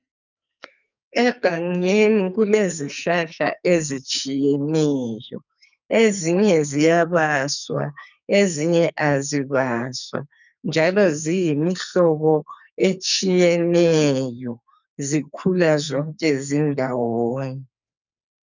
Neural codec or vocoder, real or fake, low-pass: codec, 44.1 kHz, 2.6 kbps, SNAC; fake; 7.2 kHz